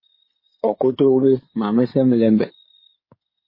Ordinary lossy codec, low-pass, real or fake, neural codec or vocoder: MP3, 24 kbps; 5.4 kHz; fake; codec, 16 kHz in and 24 kHz out, 2.2 kbps, FireRedTTS-2 codec